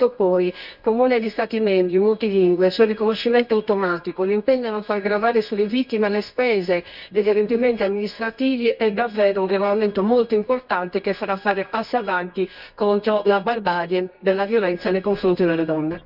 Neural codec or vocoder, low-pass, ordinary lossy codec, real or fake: codec, 24 kHz, 0.9 kbps, WavTokenizer, medium music audio release; 5.4 kHz; none; fake